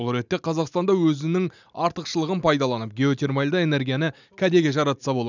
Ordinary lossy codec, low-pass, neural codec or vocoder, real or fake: none; 7.2 kHz; none; real